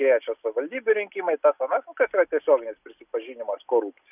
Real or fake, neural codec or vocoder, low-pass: real; none; 3.6 kHz